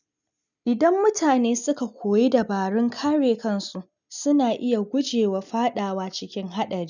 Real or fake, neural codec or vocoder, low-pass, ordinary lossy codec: real; none; 7.2 kHz; none